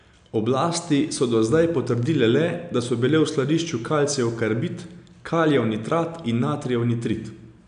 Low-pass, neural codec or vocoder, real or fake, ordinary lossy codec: 9.9 kHz; none; real; AAC, 96 kbps